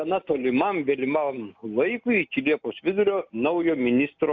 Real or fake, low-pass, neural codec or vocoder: real; 7.2 kHz; none